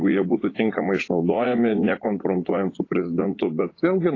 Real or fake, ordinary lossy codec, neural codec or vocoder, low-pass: fake; AAC, 32 kbps; vocoder, 44.1 kHz, 80 mel bands, Vocos; 7.2 kHz